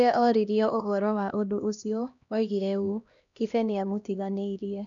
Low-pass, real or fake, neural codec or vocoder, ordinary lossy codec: 7.2 kHz; fake; codec, 16 kHz, 1 kbps, X-Codec, HuBERT features, trained on LibriSpeech; none